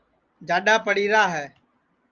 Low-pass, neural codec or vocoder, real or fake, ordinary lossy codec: 7.2 kHz; none; real; Opus, 24 kbps